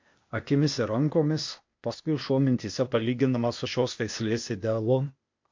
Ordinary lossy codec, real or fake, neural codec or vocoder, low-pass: MP3, 48 kbps; fake; codec, 16 kHz, 0.8 kbps, ZipCodec; 7.2 kHz